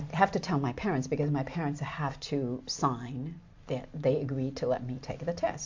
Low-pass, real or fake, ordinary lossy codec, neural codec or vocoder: 7.2 kHz; real; MP3, 48 kbps; none